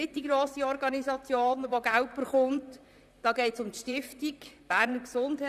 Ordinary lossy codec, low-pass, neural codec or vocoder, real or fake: none; 14.4 kHz; vocoder, 44.1 kHz, 128 mel bands, Pupu-Vocoder; fake